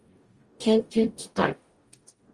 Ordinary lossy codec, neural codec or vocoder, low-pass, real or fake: Opus, 24 kbps; codec, 44.1 kHz, 0.9 kbps, DAC; 10.8 kHz; fake